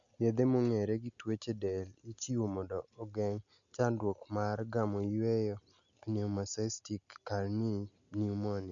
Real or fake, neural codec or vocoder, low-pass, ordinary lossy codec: real; none; 7.2 kHz; none